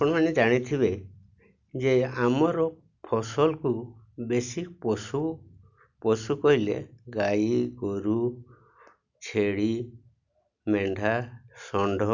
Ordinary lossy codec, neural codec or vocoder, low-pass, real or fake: none; none; 7.2 kHz; real